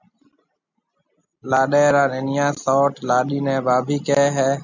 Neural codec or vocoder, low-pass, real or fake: none; 7.2 kHz; real